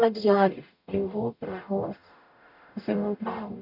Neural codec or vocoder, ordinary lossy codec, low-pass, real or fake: codec, 44.1 kHz, 0.9 kbps, DAC; none; 5.4 kHz; fake